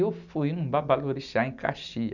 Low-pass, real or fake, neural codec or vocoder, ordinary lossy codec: 7.2 kHz; real; none; none